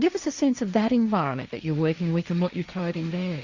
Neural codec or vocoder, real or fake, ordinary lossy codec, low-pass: codec, 16 kHz, 1.1 kbps, Voila-Tokenizer; fake; Opus, 64 kbps; 7.2 kHz